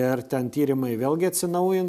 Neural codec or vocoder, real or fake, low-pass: none; real; 14.4 kHz